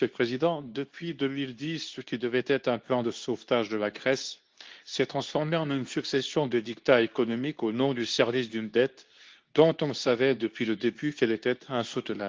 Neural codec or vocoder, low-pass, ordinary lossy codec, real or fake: codec, 24 kHz, 0.9 kbps, WavTokenizer, medium speech release version 2; 7.2 kHz; Opus, 32 kbps; fake